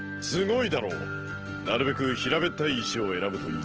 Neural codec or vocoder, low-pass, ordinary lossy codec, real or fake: none; 7.2 kHz; Opus, 16 kbps; real